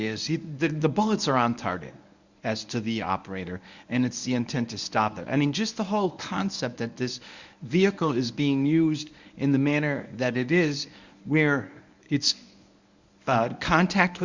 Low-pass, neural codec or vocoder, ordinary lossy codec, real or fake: 7.2 kHz; codec, 24 kHz, 0.9 kbps, WavTokenizer, medium speech release version 1; Opus, 64 kbps; fake